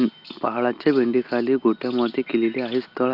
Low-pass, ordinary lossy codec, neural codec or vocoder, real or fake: 5.4 kHz; Opus, 32 kbps; none; real